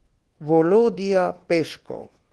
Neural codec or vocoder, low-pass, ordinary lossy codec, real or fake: codec, 24 kHz, 1.2 kbps, DualCodec; 10.8 kHz; Opus, 16 kbps; fake